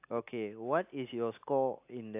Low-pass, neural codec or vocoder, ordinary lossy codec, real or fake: 3.6 kHz; none; AAC, 32 kbps; real